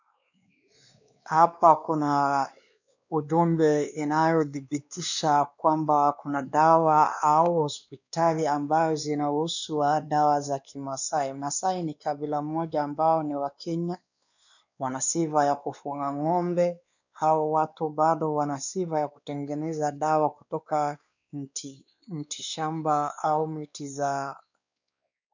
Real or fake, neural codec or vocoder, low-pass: fake; codec, 16 kHz, 2 kbps, X-Codec, WavLM features, trained on Multilingual LibriSpeech; 7.2 kHz